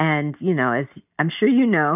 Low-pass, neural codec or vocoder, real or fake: 3.6 kHz; none; real